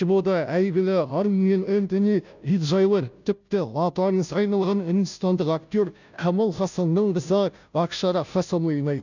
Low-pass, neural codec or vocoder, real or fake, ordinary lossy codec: 7.2 kHz; codec, 16 kHz, 0.5 kbps, FunCodec, trained on Chinese and English, 25 frames a second; fake; none